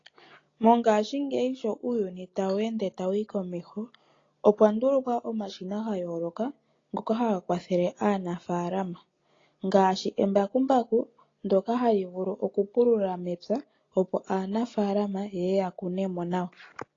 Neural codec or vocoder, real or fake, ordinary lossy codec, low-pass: none; real; AAC, 32 kbps; 7.2 kHz